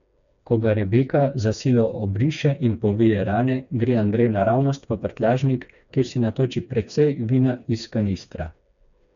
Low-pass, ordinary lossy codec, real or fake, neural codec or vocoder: 7.2 kHz; none; fake; codec, 16 kHz, 2 kbps, FreqCodec, smaller model